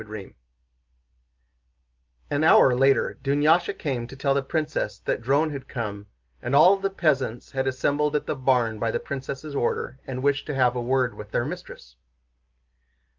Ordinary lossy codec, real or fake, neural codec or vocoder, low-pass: Opus, 24 kbps; real; none; 7.2 kHz